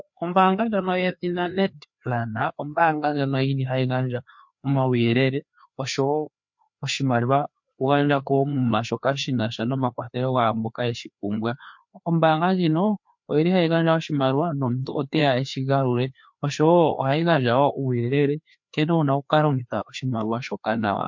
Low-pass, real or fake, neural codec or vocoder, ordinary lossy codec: 7.2 kHz; fake; codec, 16 kHz, 2 kbps, FreqCodec, larger model; MP3, 48 kbps